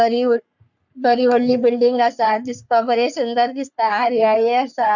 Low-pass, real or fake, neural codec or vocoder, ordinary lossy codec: 7.2 kHz; fake; codec, 44.1 kHz, 3.4 kbps, Pupu-Codec; none